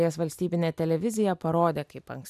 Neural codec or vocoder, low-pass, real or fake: none; 14.4 kHz; real